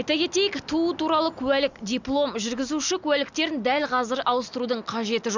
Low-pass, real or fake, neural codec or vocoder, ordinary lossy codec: 7.2 kHz; real; none; Opus, 64 kbps